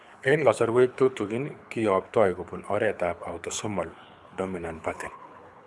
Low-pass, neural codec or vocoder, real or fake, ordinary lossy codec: none; codec, 24 kHz, 6 kbps, HILCodec; fake; none